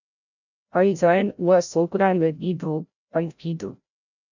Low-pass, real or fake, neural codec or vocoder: 7.2 kHz; fake; codec, 16 kHz, 0.5 kbps, FreqCodec, larger model